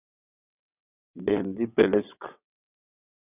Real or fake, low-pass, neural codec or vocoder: fake; 3.6 kHz; vocoder, 22.05 kHz, 80 mel bands, WaveNeXt